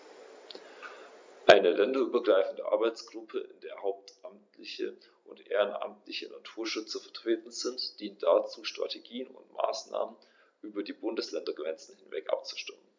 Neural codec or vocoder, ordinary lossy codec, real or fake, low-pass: none; MP3, 64 kbps; real; 7.2 kHz